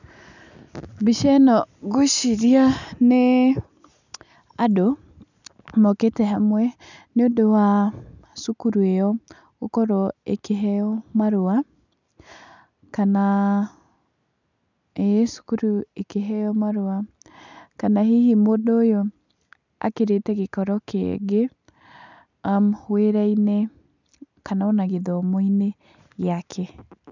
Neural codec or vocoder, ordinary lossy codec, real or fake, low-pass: none; none; real; 7.2 kHz